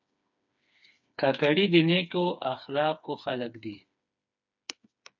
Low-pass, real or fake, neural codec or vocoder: 7.2 kHz; fake; codec, 16 kHz, 4 kbps, FreqCodec, smaller model